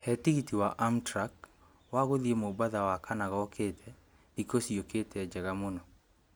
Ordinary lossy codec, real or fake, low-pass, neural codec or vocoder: none; real; none; none